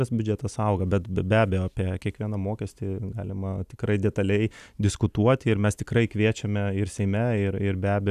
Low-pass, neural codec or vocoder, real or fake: 14.4 kHz; none; real